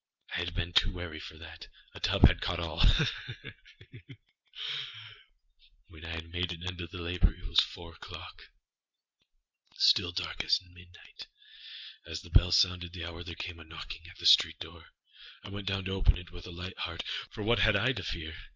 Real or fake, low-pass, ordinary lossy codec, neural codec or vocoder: real; 7.2 kHz; Opus, 32 kbps; none